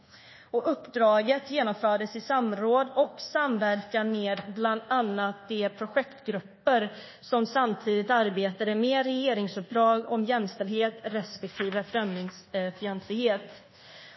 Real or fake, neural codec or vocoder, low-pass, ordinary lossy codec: fake; codec, 24 kHz, 1.2 kbps, DualCodec; 7.2 kHz; MP3, 24 kbps